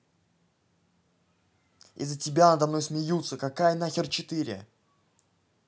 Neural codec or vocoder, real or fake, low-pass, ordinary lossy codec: none; real; none; none